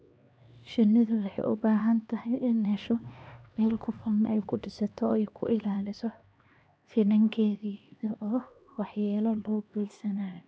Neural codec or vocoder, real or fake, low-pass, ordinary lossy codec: codec, 16 kHz, 2 kbps, X-Codec, HuBERT features, trained on LibriSpeech; fake; none; none